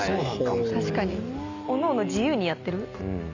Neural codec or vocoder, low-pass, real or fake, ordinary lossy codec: none; 7.2 kHz; real; none